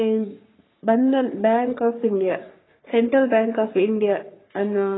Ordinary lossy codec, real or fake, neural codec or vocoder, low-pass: AAC, 16 kbps; fake; codec, 44.1 kHz, 3.4 kbps, Pupu-Codec; 7.2 kHz